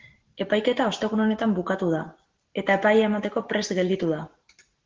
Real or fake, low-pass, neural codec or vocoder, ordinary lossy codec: real; 7.2 kHz; none; Opus, 16 kbps